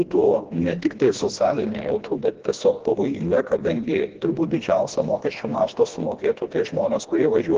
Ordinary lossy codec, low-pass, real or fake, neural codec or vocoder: Opus, 16 kbps; 7.2 kHz; fake; codec, 16 kHz, 1 kbps, FreqCodec, smaller model